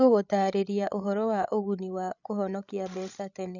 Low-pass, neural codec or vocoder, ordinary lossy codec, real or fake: 7.2 kHz; codec, 16 kHz, 16 kbps, FreqCodec, larger model; none; fake